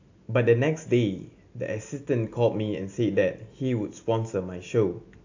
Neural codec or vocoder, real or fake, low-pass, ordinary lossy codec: none; real; 7.2 kHz; AAC, 48 kbps